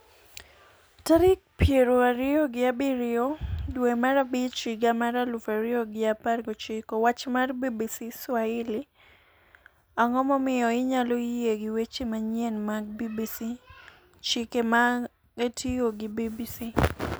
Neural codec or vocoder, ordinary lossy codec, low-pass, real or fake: none; none; none; real